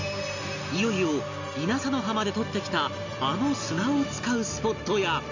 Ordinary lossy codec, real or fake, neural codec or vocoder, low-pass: none; real; none; 7.2 kHz